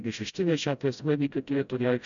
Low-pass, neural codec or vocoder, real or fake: 7.2 kHz; codec, 16 kHz, 0.5 kbps, FreqCodec, smaller model; fake